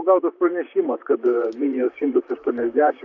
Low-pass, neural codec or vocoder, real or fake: 7.2 kHz; vocoder, 44.1 kHz, 128 mel bands, Pupu-Vocoder; fake